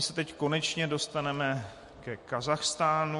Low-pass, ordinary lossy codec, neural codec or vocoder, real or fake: 14.4 kHz; MP3, 48 kbps; none; real